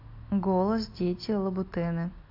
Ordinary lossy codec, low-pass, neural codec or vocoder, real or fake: AAC, 32 kbps; 5.4 kHz; none; real